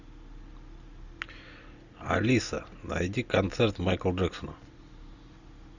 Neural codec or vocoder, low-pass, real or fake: none; 7.2 kHz; real